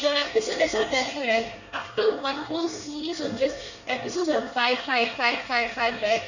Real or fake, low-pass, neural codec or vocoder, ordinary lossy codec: fake; 7.2 kHz; codec, 24 kHz, 1 kbps, SNAC; none